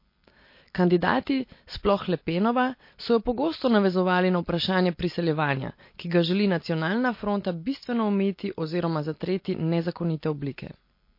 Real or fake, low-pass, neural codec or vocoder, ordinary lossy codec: real; 5.4 kHz; none; MP3, 32 kbps